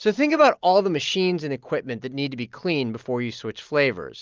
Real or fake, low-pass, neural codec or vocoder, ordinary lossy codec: real; 7.2 kHz; none; Opus, 32 kbps